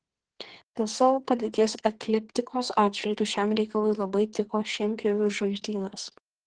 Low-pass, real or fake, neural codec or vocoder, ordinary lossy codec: 9.9 kHz; fake; codec, 44.1 kHz, 2.6 kbps, SNAC; Opus, 16 kbps